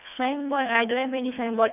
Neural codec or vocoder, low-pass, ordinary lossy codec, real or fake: codec, 24 kHz, 1.5 kbps, HILCodec; 3.6 kHz; none; fake